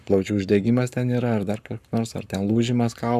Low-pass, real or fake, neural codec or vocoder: 14.4 kHz; fake; codec, 44.1 kHz, 7.8 kbps, Pupu-Codec